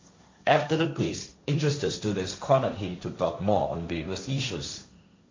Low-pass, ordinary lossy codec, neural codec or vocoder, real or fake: 7.2 kHz; MP3, 48 kbps; codec, 16 kHz, 1.1 kbps, Voila-Tokenizer; fake